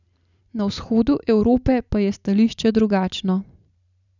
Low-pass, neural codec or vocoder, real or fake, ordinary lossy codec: 7.2 kHz; vocoder, 44.1 kHz, 128 mel bands every 512 samples, BigVGAN v2; fake; none